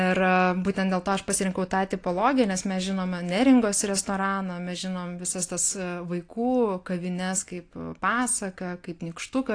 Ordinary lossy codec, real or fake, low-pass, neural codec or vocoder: AAC, 48 kbps; real; 9.9 kHz; none